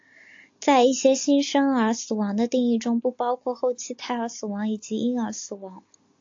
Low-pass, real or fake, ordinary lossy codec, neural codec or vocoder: 7.2 kHz; real; AAC, 48 kbps; none